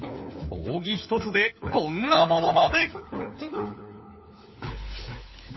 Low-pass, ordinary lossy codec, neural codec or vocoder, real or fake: 7.2 kHz; MP3, 24 kbps; codec, 16 kHz, 4 kbps, FunCodec, trained on LibriTTS, 50 frames a second; fake